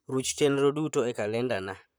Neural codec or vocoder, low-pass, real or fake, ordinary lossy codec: vocoder, 44.1 kHz, 128 mel bands, Pupu-Vocoder; none; fake; none